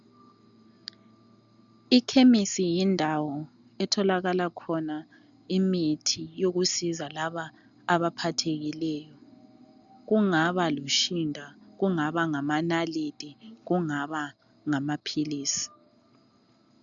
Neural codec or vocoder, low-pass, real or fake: none; 7.2 kHz; real